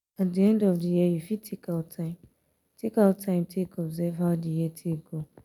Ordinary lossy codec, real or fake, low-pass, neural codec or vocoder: none; real; 19.8 kHz; none